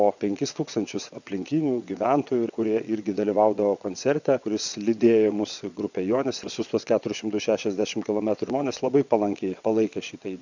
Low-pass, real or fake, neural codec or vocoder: 7.2 kHz; fake; vocoder, 22.05 kHz, 80 mel bands, WaveNeXt